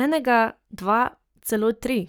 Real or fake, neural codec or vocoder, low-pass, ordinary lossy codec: fake; codec, 44.1 kHz, 7.8 kbps, Pupu-Codec; none; none